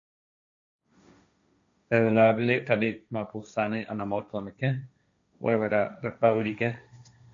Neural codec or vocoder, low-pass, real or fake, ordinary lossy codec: codec, 16 kHz, 1.1 kbps, Voila-Tokenizer; 7.2 kHz; fake; AAC, 64 kbps